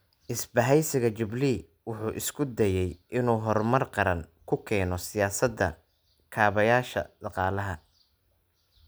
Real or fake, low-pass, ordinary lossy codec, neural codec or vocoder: real; none; none; none